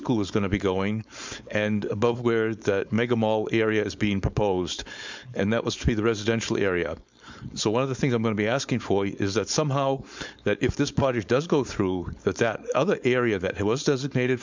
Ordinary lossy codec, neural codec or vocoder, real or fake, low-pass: MP3, 64 kbps; codec, 16 kHz, 4.8 kbps, FACodec; fake; 7.2 kHz